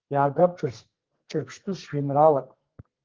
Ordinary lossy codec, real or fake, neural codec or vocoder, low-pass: Opus, 16 kbps; fake; codec, 32 kHz, 1.9 kbps, SNAC; 7.2 kHz